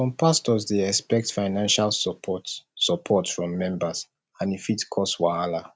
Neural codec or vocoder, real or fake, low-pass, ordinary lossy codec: none; real; none; none